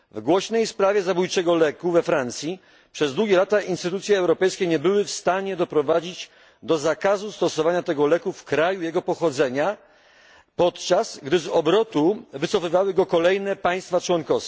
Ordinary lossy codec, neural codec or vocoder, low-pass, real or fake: none; none; none; real